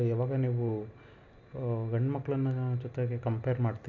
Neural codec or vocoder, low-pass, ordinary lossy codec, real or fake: none; 7.2 kHz; none; real